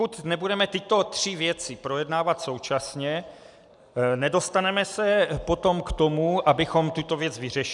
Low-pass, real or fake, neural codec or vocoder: 10.8 kHz; real; none